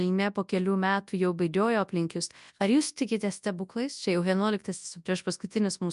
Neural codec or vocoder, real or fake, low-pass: codec, 24 kHz, 0.9 kbps, WavTokenizer, large speech release; fake; 10.8 kHz